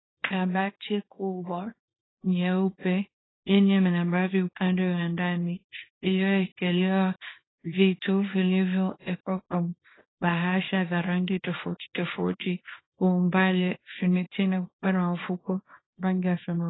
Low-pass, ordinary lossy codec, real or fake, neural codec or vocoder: 7.2 kHz; AAC, 16 kbps; fake; codec, 24 kHz, 0.9 kbps, WavTokenizer, small release